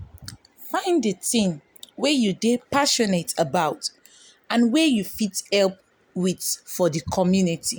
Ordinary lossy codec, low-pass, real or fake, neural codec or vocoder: none; none; real; none